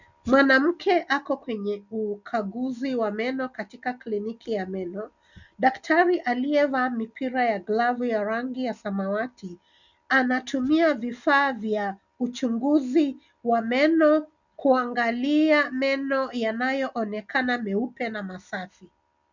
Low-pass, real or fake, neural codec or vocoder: 7.2 kHz; real; none